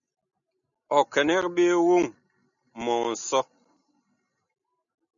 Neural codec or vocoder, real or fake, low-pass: none; real; 7.2 kHz